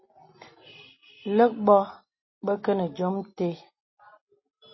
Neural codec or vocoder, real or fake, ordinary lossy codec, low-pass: none; real; MP3, 24 kbps; 7.2 kHz